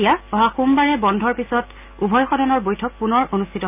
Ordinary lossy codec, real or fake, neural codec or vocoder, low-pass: none; real; none; 3.6 kHz